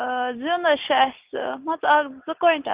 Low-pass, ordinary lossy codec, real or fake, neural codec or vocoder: 3.6 kHz; Opus, 32 kbps; real; none